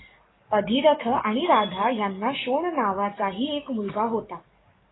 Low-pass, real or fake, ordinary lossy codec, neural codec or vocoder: 7.2 kHz; fake; AAC, 16 kbps; codec, 44.1 kHz, 7.8 kbps, DAC